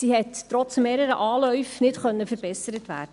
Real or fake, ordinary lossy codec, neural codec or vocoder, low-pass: real; none; none; 10.8 kHz